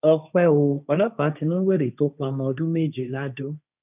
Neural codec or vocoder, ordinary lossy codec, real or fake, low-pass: codec, 16 kHz, 1.1 kbps, Voila-Tokenizer; none; fake; 3.6 kHz